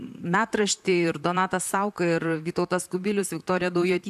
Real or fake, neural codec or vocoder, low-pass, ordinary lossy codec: fake; vocoder, 44.1 kHz, 128 mel bands, Pupu-Vocoder; 14.4 kHz; AAC, 96 kbps